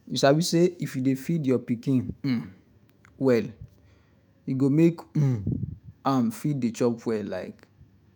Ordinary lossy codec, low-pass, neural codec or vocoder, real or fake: none; none; autoencoder, 48 kHz, 128 numbers a frame, DAC-VAE, trained on Japanese speech; fake